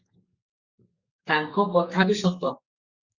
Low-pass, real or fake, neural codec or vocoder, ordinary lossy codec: 7.2 kHz; fake; codec, 32 kHz, 1.9 kbps, SNAC; Opus, 64 kbps